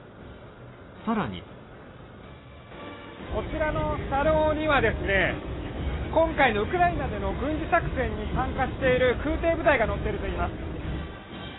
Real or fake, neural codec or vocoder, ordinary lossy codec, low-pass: real; none; AAC, 16 kbps; 7.2 kHz